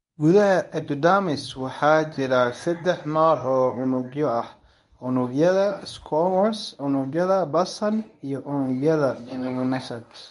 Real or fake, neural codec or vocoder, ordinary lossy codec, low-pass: fake; codec, 24 kHz, 0.9 kbps, WavTokenizer, medium speech release version 1; none; 10.8 kHz